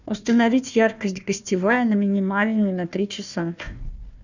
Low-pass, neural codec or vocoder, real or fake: 7.2 kHz; codec, 16 kHz, 1 kbps, FunCodec, trained on Chinese and English, 50 frames a second; fake